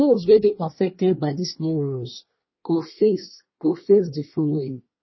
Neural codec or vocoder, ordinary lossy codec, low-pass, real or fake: codec, 24 kHz, 1 kbps, SNAC; MP3, 24 kbps; 7.2 kHz; fake